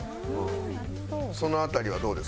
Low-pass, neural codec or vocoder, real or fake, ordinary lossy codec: none; none; real; none